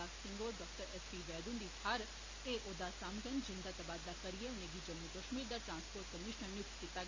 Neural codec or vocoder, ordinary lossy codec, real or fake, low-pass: none; MP3, 48 kbps; real; 7.2 kHz